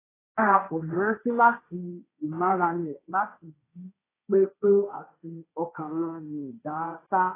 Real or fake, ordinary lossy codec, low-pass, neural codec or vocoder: fake; AAC, 16 kbps; 3.6 kHz; codec, 16 kHz, 1.1 kbps, Voila-Tokenizer